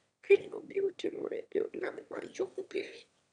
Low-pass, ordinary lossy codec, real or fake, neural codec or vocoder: 9.9 kHz; none; fake; autoencoder, 22.05 kHz, a latent of 192 numbers a frame, VITS, trained on one speaker